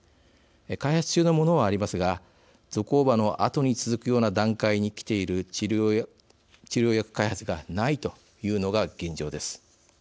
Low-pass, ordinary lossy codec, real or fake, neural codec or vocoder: none; none; real; none